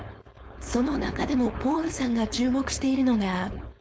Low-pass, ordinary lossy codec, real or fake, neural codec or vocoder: none; none; fake; codec, 16 kHz, 4.8 kbps, FACodec